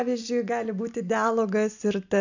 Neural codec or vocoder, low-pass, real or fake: none; 7.2 kHz; real